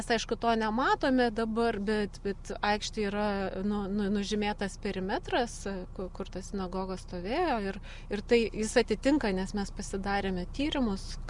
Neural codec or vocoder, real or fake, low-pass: none; real; 10.8 kHz